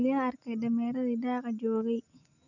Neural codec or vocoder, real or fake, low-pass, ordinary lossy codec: none; real; 7.2 kHz; none